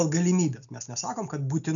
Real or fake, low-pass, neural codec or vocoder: real; 7.2 kHz; none